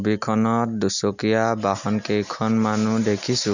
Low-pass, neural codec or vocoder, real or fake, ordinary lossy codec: 7.2 kHz; none; real; none